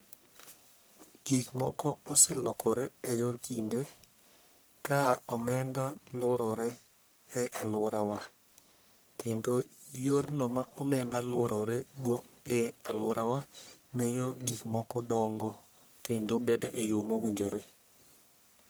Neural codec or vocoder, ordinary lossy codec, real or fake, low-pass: codec, 44.1 kHz, 1.7 kbps, Pupu-Codec; none; fake; none